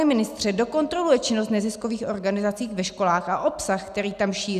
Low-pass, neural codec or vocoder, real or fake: 14.4 kHz; none; real